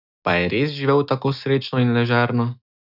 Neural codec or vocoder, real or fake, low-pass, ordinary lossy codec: none; real; 5.4 kHz; none